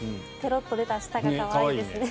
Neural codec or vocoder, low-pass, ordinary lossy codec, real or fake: none; none; none; real